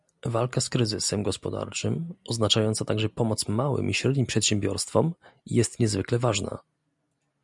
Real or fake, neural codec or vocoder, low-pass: real; none; 10.8 kHz